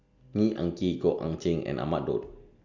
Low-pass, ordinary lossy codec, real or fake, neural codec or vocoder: 7.2 kHz; none; real; none